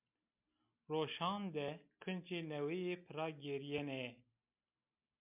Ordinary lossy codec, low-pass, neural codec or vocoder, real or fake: MP3, 32 kbps; 3.6 kHz; none; real